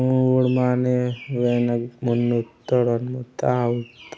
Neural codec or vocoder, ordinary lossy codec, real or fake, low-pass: none; none; real; none